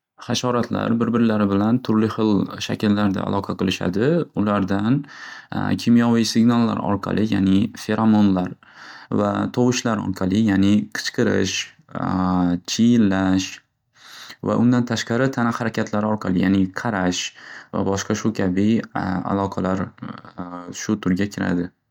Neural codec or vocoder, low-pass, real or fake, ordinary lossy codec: none; 19.8 kHz; real; MP3, 96 kbps